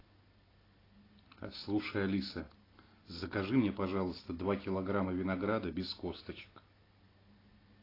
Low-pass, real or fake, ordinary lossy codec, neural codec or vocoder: 5.4 kHz; real; AAC, 24 kbps; none